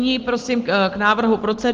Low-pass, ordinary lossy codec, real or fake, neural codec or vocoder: 7.2 kHz; Opus, 16 kbps; real; none